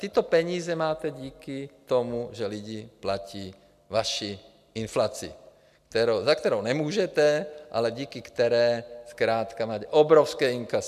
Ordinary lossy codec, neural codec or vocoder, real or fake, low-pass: MP3, 96 kbps; none; real; 14.4 kHz